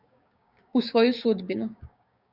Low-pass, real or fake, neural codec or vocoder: 5.4 kHz; fake; codec, 16 kHz, 6 kbps, DAC